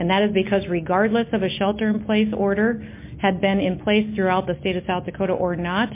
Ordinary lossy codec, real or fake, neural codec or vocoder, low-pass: MP3, 24 kbps; real; none; 3.6 kHz